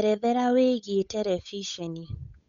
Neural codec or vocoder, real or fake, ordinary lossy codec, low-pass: codec, 16 kHz, 8 kbps, FunCodec, trained on Chinese and English, 25 frames a second; fake; none; 7.2 kHz